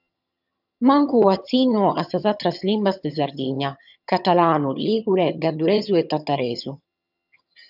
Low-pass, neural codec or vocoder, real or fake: 5.4 kHz; vocoder, 22.05 kHz, 80 mel bands, HiFi-GAN; fake